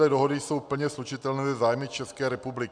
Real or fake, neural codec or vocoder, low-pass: real; none; 9.9 kHz